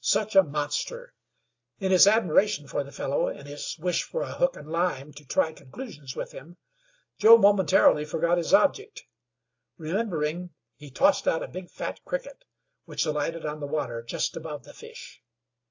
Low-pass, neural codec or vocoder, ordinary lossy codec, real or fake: 7.2 kHz; none; AAC, 48 kbps; real